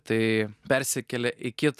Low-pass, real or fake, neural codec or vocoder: 14.4 kHz; real; none